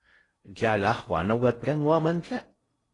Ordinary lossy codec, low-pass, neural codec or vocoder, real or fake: AAC, 32 kbps; 10.8 kHz; codec, 16 kHz in and 24 kHz out, 0.6 kbps, FocalCodec, streaming, 4096 codes; fake